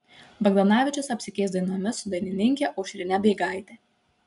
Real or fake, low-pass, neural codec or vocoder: real; 10.8 kHz; none